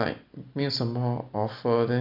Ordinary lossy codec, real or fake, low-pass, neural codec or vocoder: none; real; 5.4 kHz; none